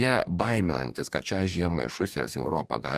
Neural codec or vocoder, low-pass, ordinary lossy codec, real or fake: codec, 44.1 kHz, 2.6 kbps, DAC; 14.4 kHz; Opus, 64 kbps; fake